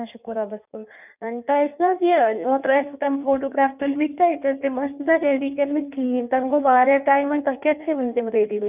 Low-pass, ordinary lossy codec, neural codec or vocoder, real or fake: 3.6 kHz; none; codec, 16 kHz in and 24 kHz out, 1.1 kbps, FireRedTTS-2 codec; fake